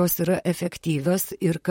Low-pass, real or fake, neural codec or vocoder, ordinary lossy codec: 19.8 kHz; fake; vocoder, 44.1 kHz, 128 mel bands, Pupu-Vocoder; MP3, 64 kbps